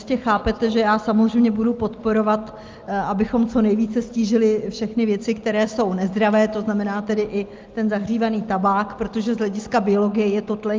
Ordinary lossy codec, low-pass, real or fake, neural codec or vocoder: Opus, 32 kbps; 7.2 kHz; real; none